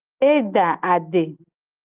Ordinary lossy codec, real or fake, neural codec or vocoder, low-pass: Opus, 24 kbps; real; none; 3.6 kHz